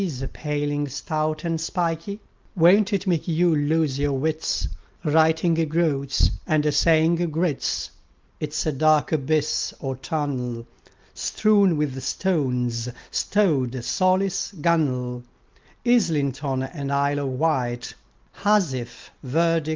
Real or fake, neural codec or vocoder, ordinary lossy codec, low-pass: real; none; Opus, 32 kbps; 7.2 kHz